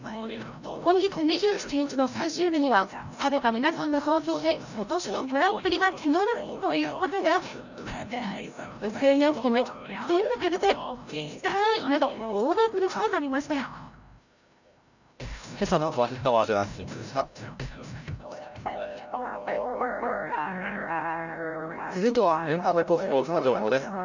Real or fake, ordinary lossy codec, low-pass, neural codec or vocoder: fake; none; 7.2 kHz; codec, 16 kHz, 0.5 kbps, FreqCodec, larger model